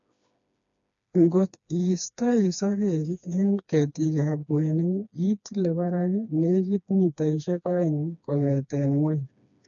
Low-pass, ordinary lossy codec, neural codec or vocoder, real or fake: 7.2 kHz; none; codec, 16 kHz, 2 kbps, FreqCodec, smaller model; fake